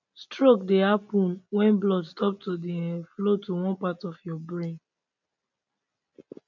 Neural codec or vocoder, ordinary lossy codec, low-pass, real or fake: none; none; 7.2 kHz; real